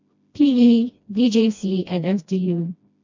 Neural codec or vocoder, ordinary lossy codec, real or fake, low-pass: codec, 16 kHz, 1 kbps, FreqCodec, smaller model; AAC, 48 kbps; fake; 7.2 kHz